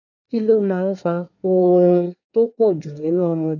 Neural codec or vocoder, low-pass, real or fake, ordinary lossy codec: codec, 24 kHz, 1 kbps, SNAC; 7.2 kHz; fake; none